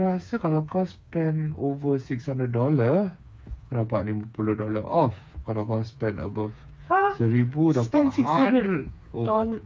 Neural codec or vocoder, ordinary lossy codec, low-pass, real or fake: codec, 16 kHz, 4 kbps, FreqCodec, smaller model; none; none; fake